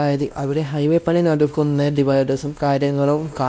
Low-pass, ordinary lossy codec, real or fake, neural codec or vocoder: none; none; fake; codec, 16 kHz, 1 kbps, X-Codec, WavLM features, trained on Multilingual LibriSpeech